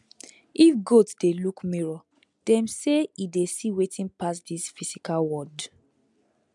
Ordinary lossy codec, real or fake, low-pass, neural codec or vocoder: MP3, 96 kbps; real; 10.8 kHz; none